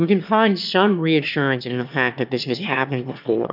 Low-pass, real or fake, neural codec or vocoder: 5.4 kHz; fake; autoencoder, 22.05 kHz, a latent of 192 numbers a frame, VITS, trained on one speaker